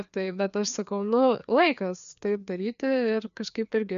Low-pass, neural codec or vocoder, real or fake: 7.2 kHz; codec, 16 kHz, 2 kbps, FreqCodec, larger model; fake